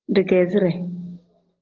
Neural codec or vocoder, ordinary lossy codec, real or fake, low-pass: none; Opus, 16 kbps; real; 7.2 kHz